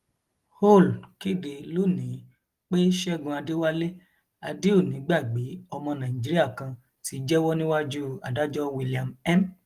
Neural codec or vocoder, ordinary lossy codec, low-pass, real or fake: none; Opus, 24 kbps; 14.4 kHz; real